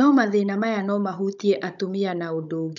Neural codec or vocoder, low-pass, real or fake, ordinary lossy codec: codec, 16 kHz, 16 kbps, FunCodec, trained on Chinese and English, 50 frames a second; 7.2 kHz; fake; none